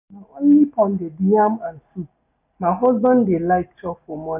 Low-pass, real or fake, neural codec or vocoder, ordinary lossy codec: 3.6 kHz; real; none; none